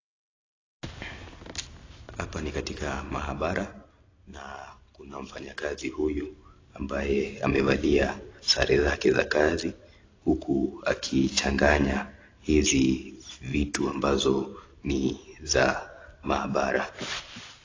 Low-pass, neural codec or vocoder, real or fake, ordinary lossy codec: 7.2 kHz; none; real; AAC, 32 kbps